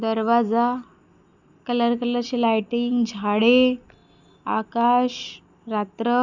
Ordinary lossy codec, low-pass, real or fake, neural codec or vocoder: none; 7.2 kHz; real; none